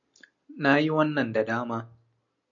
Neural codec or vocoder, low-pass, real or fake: none; 7.2 kHz; real